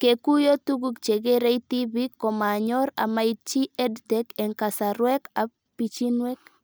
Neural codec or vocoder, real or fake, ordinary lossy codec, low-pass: none; real; none; none